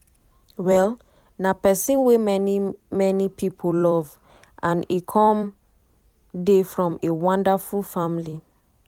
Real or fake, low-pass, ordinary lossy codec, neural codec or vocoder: fake; 19.8 kHz; none; vocoder, 44.1 kHz, 128 mel bands every 256 samples, BigVGAN v2